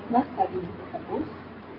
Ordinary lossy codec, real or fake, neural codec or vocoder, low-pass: AAC, 24 kbps; real; none; 5.4 kHz